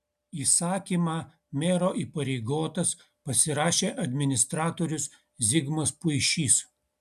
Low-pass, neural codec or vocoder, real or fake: 14.4 kHz; none; real